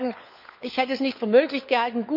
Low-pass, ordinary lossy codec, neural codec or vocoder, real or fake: 5.4 kHz; none; codec, 16 kHz, 4 kbps, FunCodec, trained on LibriTTS, 50 frames a second; fake